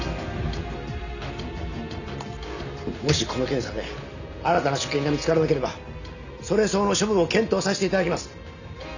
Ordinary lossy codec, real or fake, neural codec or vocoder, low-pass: none; real; none; 7.2 kHz